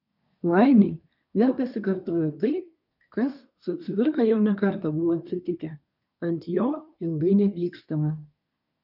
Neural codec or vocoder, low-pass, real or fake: codec, 24 kHz, 1 kbps, SNAC; 5.4 kHz; fake